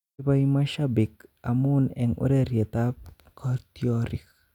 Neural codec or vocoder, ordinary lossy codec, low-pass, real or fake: none; none; 19.8 kHz; real